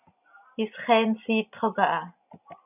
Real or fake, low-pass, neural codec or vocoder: real; 3.6 kHz; none